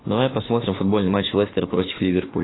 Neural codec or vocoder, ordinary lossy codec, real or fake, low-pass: autoencoder, 48 kHz, 32 numbers a frame, DAC-VAE, trained on Japanese speech; AAC, 16 kbps; fake; 7.2 kHz